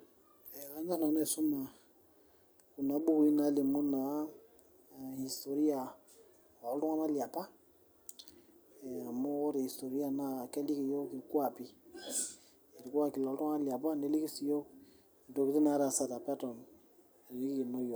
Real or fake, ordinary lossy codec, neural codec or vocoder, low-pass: real; none; none; none